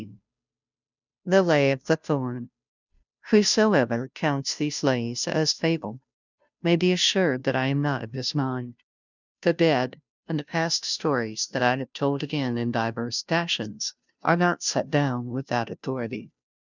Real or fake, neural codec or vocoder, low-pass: fake; codec, 16 kHz, 0.5 kbps, FunCodec, trained on Chinese and English, 25 frames a second; 7.2 kHz